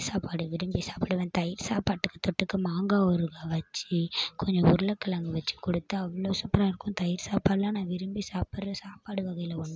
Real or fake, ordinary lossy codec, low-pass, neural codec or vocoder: real; none; none; none